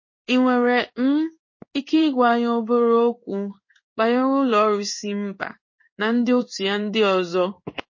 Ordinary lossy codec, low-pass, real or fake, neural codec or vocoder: MP3, 32 kbps; 7.2 kHz; fake; codec, 16 kHz in and 24 kHz out, 1 kbps, XY-Tokenizer